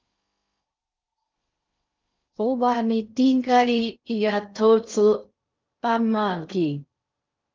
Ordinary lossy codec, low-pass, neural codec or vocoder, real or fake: Opus, 24 kbps; 7.2 kHz; codec, 16 kHz in and 24 kHz out, 0.6 kbps, FocalCodec, streaming, 4096 codes; fake